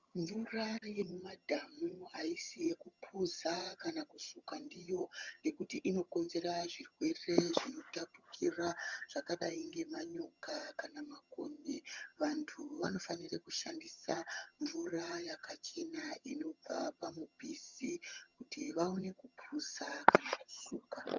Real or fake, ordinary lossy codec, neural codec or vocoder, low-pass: fake; Opus, 64 kbps; vocoder, 22.05 kHz, 80 mel bands, HiFi-GAN; 7.2 kHz